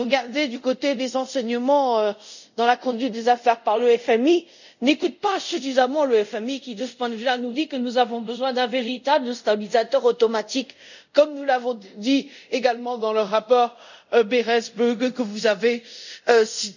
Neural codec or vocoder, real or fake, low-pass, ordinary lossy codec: codec, 24 kHz, 0.5 kbps, DualCodec; fake; 7.2 kHz; none